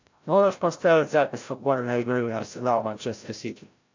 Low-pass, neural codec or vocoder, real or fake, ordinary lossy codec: 7.2 kHz; codec, 16 kHz, 0.5 kbps, FreqCodec, larger model; fake; AAC, 48 kbps